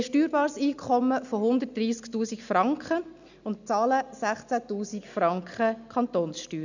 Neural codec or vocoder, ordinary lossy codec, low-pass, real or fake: none; none; 7.2 kHz; real